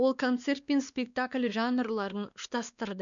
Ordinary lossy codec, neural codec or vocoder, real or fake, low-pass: none; codec, 16 kHz, 2 kbps, X-Codec, WavLM features, trained on Multilingual LibriSpeech; fake; 7.2 kHz